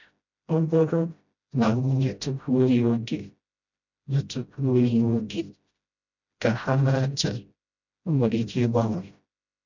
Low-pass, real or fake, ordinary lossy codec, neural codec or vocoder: 7.2 kHz; fake; none; codec, 16 kHz, 0.5 kbps, FreqCodec, smaller model